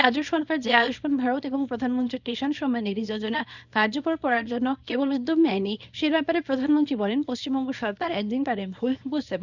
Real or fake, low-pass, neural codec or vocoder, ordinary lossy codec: fake; 7.2 kHz; codec, 24 kHz, 0.9 kbps, WavTokenizer, small release; none